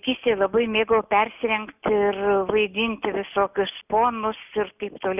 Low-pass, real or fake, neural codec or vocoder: 3.6 kHz; real; none